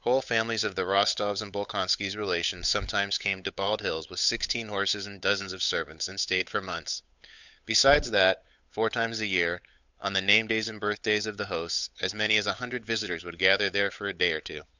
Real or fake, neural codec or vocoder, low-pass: fake; codec, 16 kHz, 8 kbps, FunCodec, trained on Chinese and English, 25 frames a second; 7.2 kHz